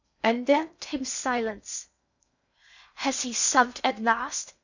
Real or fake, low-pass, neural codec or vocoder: fake; 7.2 kHz; codec, 16 kHz in and 24 kHz out, 0.6 kbps, FocalCodec, streaming, 2048 codes